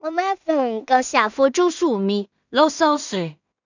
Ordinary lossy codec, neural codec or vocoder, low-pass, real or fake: none; codec, 16 kHz in and 24 kHz out, 0.4 kbps, LongCat-Audio-Codec, two codebook decoder; 7.2 kHz; fake